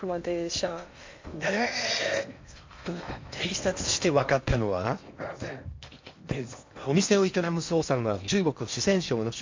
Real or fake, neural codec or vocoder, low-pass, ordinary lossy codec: fake; codec, 16 kHz in and 24 kHz out, 0.8 kbps, FocalCodec, streaming, 65536 codes; 7.2 kHz; MP3, 48 kbps